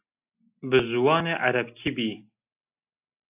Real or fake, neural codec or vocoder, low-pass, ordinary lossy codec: real; none; 3.6 kHz; AAC, 24 kbps